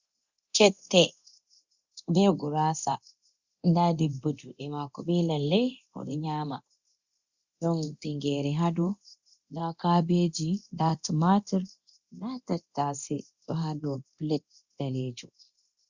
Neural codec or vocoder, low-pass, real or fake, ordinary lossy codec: codec, 24 kHz, 0.9 kbps, DualCodec; 7.2 kHz; fake; Opus, 64 kbps